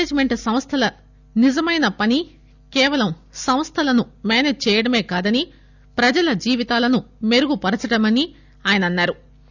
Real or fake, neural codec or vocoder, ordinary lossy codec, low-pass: real; none; none; 7.2 kHz